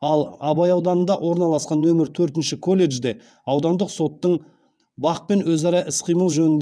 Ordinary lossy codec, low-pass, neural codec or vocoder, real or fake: none; none; vocoder, 22.05 kHz, 80 mel bands, WaveNeXt; fake